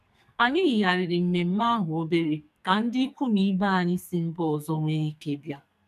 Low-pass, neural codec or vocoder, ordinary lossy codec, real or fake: 14.4 kHz; codec, 44.1 kHz, 2.6 kbps, SNAC; none; fake